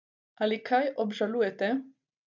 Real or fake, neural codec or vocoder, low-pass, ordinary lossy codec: real; none; 7.2 kHz; none